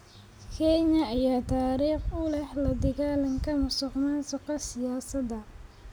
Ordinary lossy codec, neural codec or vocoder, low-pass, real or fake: none; none; none; real